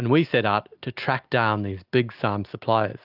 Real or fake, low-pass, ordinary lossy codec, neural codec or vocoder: real; 5.4 kHz; Opus, 24 kbps; none